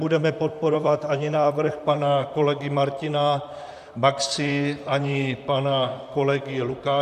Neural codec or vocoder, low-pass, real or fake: vocoder, 44.1 kHz, 128 mel bands, Pupu-Vocoder; 14.4 kHz; fake